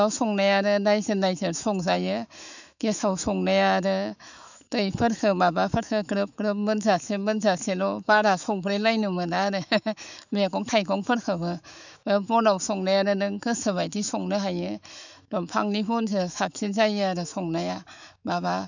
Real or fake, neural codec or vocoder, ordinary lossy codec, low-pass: fake; autoencoder, 48 kHz, 128 numbers a frame, DAC-VAE, trained on Japanese speech; none; 7.2 kHz